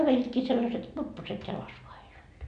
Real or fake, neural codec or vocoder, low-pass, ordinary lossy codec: real; none; 9.9 kHz; none